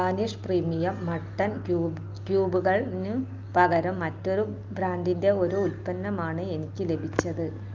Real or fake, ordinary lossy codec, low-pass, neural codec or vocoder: real; Opus, 32 kbps; 7.2 kHz; none